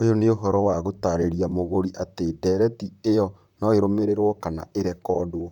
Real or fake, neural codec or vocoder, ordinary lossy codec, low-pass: fake; vocoder, 44.1 kHz, 128 mel bands, Pupu-Vocoder; none; 19.8 kHz